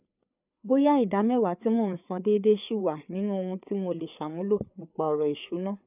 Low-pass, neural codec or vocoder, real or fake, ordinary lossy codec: 3.6 kHz; codec, 16 kHz, 4 kbps, FreqCodec, larger model; fake; none